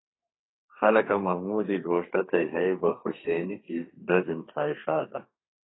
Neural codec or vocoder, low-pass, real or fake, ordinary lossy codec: codec, 32 kHz, 1.9 kbps, SNAC; 7.2 kHz; fake; AAC, 16 kbps